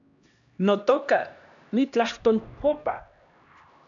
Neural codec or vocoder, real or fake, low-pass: codec, 16 kHz, 1 kbps, X-Codec, HuBERT features, trained on LibriSpeech; fake; 7.2 kHz